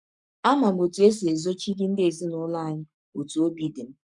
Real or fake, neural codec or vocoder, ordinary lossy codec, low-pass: fake; codec, 44.1 kHz, 7.8 kbps, Pupu-Codec; none; 10.8 kHz